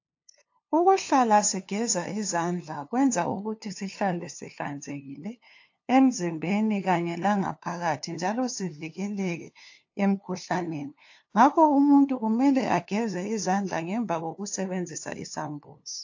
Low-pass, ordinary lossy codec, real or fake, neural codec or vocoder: 7.2 kHz; AAC, 48 kbps; fake; codec, 16 kHz, 2 kbps, FunCodec, trained on LibriTTS, 25 frames a second